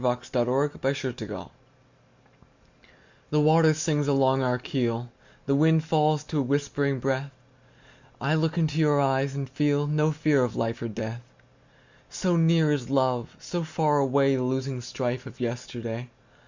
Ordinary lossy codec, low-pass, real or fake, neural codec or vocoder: Opus, 64 kbps; 7.2 kHz; real; none